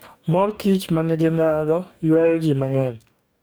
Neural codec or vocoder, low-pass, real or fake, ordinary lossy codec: codec, 44.1 kHz, 2.6 kbps, DAC; none; fake; none